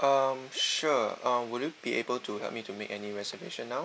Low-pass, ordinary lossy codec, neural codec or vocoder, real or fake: none; none; none; real